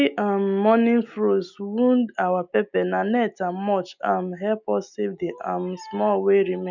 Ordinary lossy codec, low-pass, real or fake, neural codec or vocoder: none; 7.2 kHz; real; none